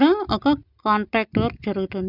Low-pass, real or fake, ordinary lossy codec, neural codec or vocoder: 5.4 kHz; real; none; none